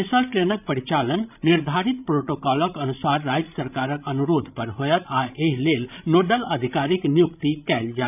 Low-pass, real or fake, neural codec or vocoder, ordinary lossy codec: 3.6 kHz; fake; codec, 16 kHz, 16 kbps, FreqCodec, larger model; none